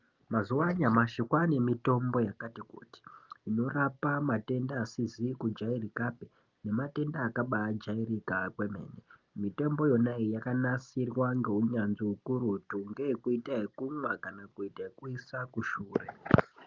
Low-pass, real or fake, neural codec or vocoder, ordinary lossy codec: 7.2 kHz; real; none; Opus, 24 kbps